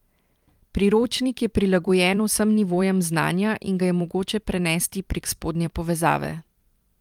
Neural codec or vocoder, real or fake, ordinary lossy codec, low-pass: vocoder, 44.1 kHz, 128 mel bands every 256 samples, BigVGAN v2; fake; Opus, 32 kbps; 19.8 kHz